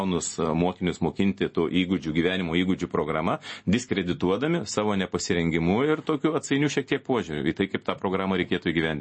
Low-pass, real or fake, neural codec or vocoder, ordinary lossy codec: 9.9 kHz; real; none; MP3, 32 kbps